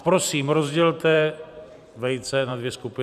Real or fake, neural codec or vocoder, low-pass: fake; vocoder, 44.1 kHz, 128 mel bands every 512 samples, BigVGAN v2; 14.4 kHz